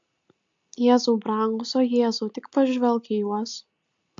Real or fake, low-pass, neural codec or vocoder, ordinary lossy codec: real; 7.2 kHz; none; AAC, 64 kbps